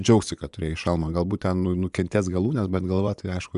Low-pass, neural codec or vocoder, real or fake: 10.8 kHz; vocoder, 24 kHz, 100 mel bands, Vocos; fake